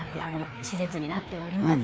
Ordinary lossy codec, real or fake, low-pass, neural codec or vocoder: none; fake; none; codec, 16 kHz, 2 kbps, FreqCodec, larger model